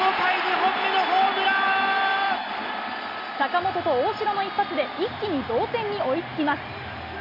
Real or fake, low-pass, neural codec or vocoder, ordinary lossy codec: real; 5.4 kHz; none; MP3, 48 kbps